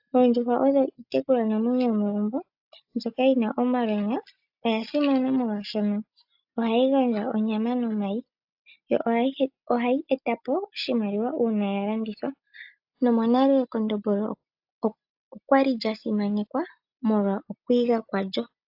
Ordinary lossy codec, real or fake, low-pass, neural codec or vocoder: AAC, 48 kbps; real; 5.4 kHz; none